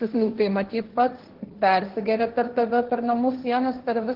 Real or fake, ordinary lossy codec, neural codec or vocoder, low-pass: fake; Opus, 16 kbps; codec, 16 kHz, 1.1 kbps, Voila-Tokenizer; 5.4 kHz